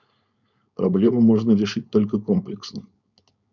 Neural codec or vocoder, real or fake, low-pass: codec, 16 kHz, 4.8 kbps, FACodec; fake; 7.2 kHz